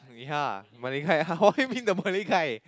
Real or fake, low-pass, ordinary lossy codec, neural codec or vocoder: real; none; none; none